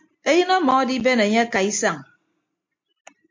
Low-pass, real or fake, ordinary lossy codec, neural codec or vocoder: 7.2 kHz; real; MP3, 48 kbps; none